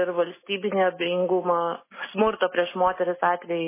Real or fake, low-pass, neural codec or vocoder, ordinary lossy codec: real; 3.6 kHz; none; MP3, 16 kbps